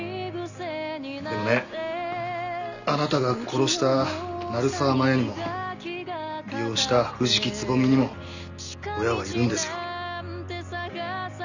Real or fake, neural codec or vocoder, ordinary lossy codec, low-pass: real; none; none; 7.2 kHz